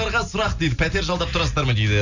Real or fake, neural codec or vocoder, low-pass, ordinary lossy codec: real; none; 7.2 kHz; none